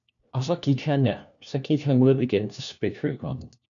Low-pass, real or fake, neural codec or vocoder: 7.2 kHz; fake; codec, 16 kHz, 1 kbps, FunCodec, trained on LibriTTS, 50 frames a second